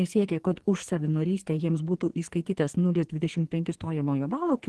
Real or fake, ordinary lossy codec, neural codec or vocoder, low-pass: fake; Opus, 16 kbps; codec, 44.1 kHz, 2.6 kbps, SNAC; 10.8 kHz